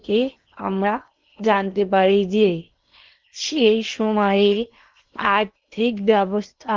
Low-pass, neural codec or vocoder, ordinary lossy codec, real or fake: 7.2 kHz; codec, 16 kHz in and 24 kHz out, 0.8 kbps, FocalCodec, streaming, 65536 codes; Opus, 32 kbps; fake